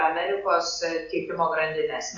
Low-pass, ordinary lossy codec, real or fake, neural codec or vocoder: 7.2 kHz; Opus, 64 kbps; real; none